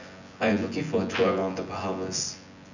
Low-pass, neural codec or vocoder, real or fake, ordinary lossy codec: 7.2 kHz; vocoder, 24 kHz, 100 mel bands, Vocos; fake; none